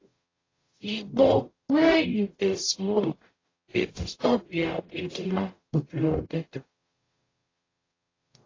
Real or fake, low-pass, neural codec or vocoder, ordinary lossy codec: fake; 7.2 kHz; codec, 44.1 kHz, 0.9 kbps, DAC; AAC, 32 kbps